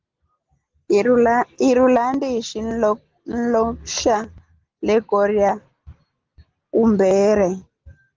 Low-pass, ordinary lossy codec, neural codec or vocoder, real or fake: 7.2 kHz; Opus, 16 kbps; none; real